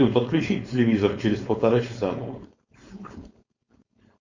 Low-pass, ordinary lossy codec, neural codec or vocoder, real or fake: 7.2 kHz; Opus, 64 kbps; codec, 16 kHz, 4.8 kbps, FACodec; fake